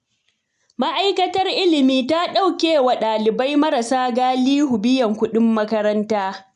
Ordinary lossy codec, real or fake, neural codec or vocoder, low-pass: none; real; none; 10.8 kHz